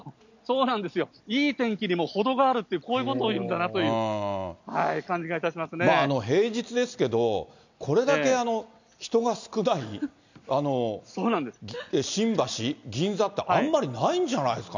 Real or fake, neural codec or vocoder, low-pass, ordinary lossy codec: real; none; 7.2 kHz; none